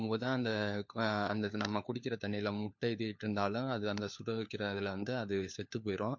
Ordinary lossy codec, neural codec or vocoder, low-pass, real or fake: MP3, 48 kbps; codec, 16 kHz, 4 kbps, FunCodec, trained on LibriTTS, 50 frames a second; 7.2 kHz; fake